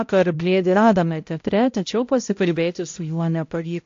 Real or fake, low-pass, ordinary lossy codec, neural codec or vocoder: fake; 7.2 kHz; AAC, 48 kbps; codec, 16 kHz, 0.5 kbps, X-Codec, HuBERT features, trained on balanced general audio